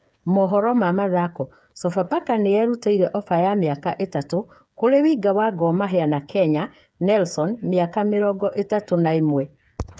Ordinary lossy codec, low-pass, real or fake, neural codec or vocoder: none; none; fake; codec, 16 kHz, 8 kbps, FreqCodec, smaller model